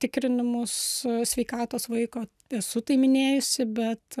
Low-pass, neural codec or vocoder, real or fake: 14.4 kHz; none; real